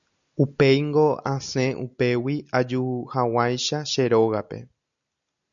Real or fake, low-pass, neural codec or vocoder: real; 7.2 kHz; none